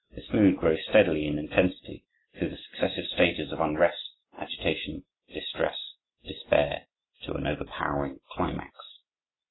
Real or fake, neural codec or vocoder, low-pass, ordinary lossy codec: real; none; 7.2 kHz; AAC, 16 kbps